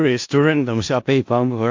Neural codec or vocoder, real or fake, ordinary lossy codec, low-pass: codec, 16 kHz in and 24 kHz out, 0.4 kbps, LongCat-Audio-Codec, four codebook decoder; fake; AAC, 48 kbps; 7.2 kHz